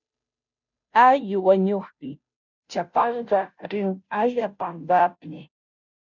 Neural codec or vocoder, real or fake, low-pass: codec, 16 kHz, 0.5 kbps, FunCodec, trained on Chinese and English, 25 frames a second; fake; 7.2 kHz